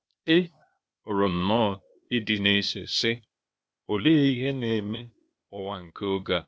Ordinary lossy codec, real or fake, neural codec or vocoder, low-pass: none; fake; codec, 16 kHz, 0.8 kbps, ZipCodec; none